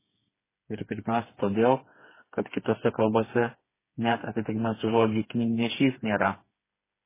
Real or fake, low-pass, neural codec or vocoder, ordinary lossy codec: fake; 3.6 kHz; codec, 16 kHz, 4 kbps, FreqCodec, smaller model; MP3, 16 kbps